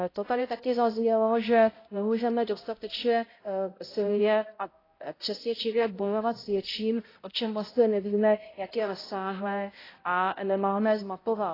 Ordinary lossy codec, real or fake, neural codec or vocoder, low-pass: AAC, 24 kbps; fake; codec, 16 kHz, 0.5 kbps, X-Codec, HuBERT features, trained on balanced general audio; 5.4 kHz